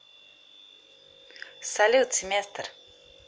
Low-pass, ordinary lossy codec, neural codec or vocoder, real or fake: none; none; none; real